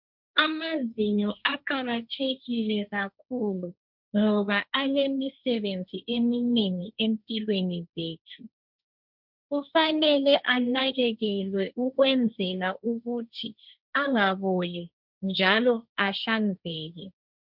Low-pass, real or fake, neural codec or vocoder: 5.4 kHz; fake; codec, 16 kHz, 1.1 kbps, Voila-Tokenizer